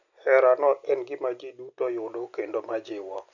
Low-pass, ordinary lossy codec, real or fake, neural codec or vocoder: 7.2 kHz; none; real; none